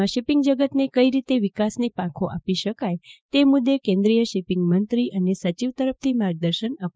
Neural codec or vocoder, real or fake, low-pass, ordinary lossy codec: codec, 16 kHz, 6 kbps, DAC; fake; none; none